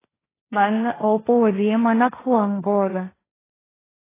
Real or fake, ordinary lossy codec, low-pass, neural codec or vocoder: fake; AAC, 16 kbps; 3.6 kHz; codec, 16 kHz, 1.1 kbps, Voila-Tokenizer